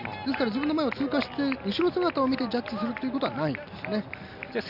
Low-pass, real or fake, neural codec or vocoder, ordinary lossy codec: 5.4 kHz; real; none; none